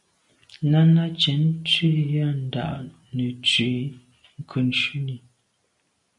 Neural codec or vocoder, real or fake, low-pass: none; real; 10.8 kHz